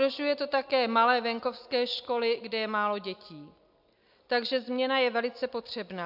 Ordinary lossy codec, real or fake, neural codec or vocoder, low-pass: AAC, 48 kbps; real; none; 5.4 kHz